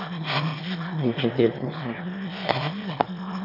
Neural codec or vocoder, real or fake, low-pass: autoencoder, 22.05 kHz, a latent of 192 numbers a frame, VITS, trained on one speaker; fake; 5.4 kHz